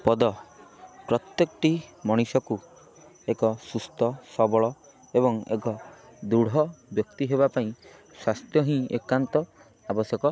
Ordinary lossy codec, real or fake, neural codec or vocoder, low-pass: none; real; none; none